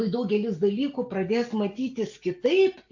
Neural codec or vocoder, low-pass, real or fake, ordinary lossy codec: none; 7.2 kHz; real; AAC, 48 kbps